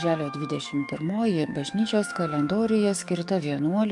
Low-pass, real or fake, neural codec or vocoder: 10.8 kHz; fake; codec, 44.1 kHz, 7.8 kbps, DAC